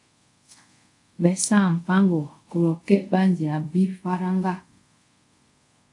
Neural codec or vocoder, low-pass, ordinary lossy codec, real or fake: codec, 24 kHz, 0.5 kbps, DualCodec; 10.8 kHz; AAC, 64 kbps; fake